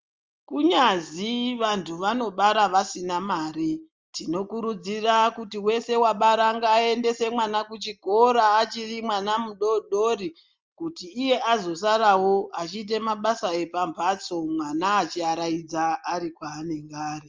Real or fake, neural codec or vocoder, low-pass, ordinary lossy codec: real; none; 7.2 kHz; Opus, 32 kbps